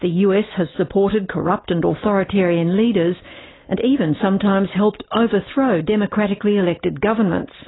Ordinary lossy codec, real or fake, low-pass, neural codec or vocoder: AAC, 16 kbps; real; 7.2 kHz; none